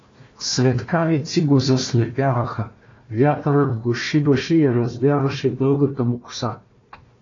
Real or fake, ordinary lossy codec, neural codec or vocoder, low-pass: fake; AAC, 32 kbps; codec, 16 kHz, 1 kbps, FunCodec, trained on Chinese and English, 50 frames a second; 7.2 kHz